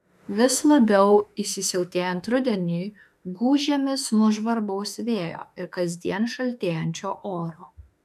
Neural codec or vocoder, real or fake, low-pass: autoencoder, 48 kHz, 32 numbers a frame, DAC-VAE, trained on Japanese speech; fake; 14.4 kHz